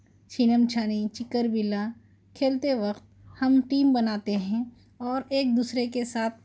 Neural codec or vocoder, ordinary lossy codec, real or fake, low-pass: none; none; real; none